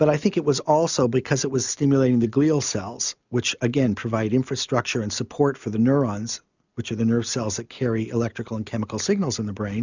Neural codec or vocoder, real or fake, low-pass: none; real; 7.2 kHz